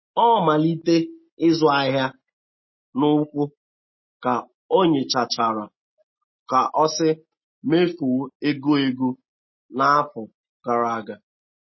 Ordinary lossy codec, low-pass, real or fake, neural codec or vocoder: MP3, 24 kbps; 7.2 kHz; real; none